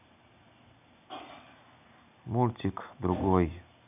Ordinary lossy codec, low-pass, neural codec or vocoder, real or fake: none; 3.6 kHz; none; real